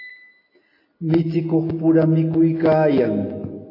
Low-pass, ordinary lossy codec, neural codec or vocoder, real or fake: 5.4 kHz; AAC, 24 kbps; none; real